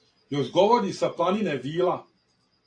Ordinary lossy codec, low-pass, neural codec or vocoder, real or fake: AAC, 48 kbps; 9.9 kHz; none; real